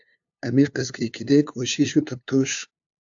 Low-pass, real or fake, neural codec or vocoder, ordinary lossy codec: 7.2 kHz; fake; codec, 16 kHz, 2 kbps, FunCodec, trained on LibriTTS, 25 frames a second; AAC, 64 kbps